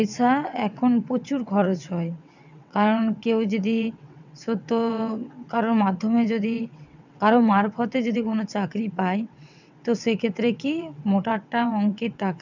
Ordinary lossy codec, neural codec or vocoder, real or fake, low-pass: none; vocoder, 22.05 kHz, 80 mel bands, WaveNeXt; fake; 7.2 kHz